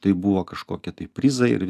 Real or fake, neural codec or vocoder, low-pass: real; none; 14.4 kHz